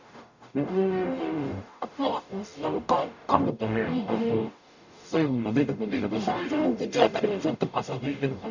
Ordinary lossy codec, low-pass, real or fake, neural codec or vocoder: none; 7.2 kHz; fake; codec, 44.1 kHz, 0.9 kbps, DAC